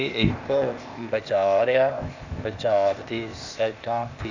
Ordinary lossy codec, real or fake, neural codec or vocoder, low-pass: Opus, 64 kbps; fake; codec, 16 kHz, 0.8 kbps, ZipCodec; 7.2 kHz